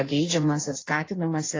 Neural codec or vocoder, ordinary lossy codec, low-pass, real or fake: codec, 16 kHz in and 24 kHz out, 1.1 kbps, FireRedTTS-2 codec; AAC, 32 kbps; 7.2 kHz; fake